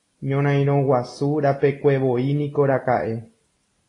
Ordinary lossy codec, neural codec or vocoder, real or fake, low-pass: AAC, 32 kbps; none; real; 10.8 kHz